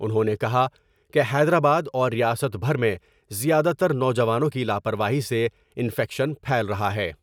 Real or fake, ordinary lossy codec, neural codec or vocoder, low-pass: real; none; none; 19.8 kHz